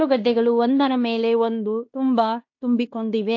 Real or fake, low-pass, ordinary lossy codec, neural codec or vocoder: fake; 7.2 kHz; AAC, 48 kbps; codec, 16 kHz in and 24 kHz out, 0.9 kbps, LongCat-Audio-Codec, fine tuned four codebook decoder